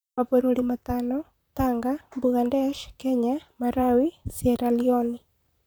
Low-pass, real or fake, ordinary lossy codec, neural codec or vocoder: none; fake; none; vocoder, 44.1 kHz, 128 mel bands, Pupu-Vocoder